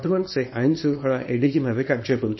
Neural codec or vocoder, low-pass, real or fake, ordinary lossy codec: codec, 24 kHz, 0.9 kbps, WavTokenizer, small release; 7.2 kHz; fake; MP3, 24 kbps